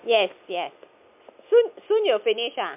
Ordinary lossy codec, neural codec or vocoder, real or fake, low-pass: MP3, 32 kbps; none; real; 3.6 kHz